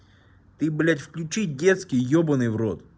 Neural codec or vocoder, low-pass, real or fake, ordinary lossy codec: none; none; real; none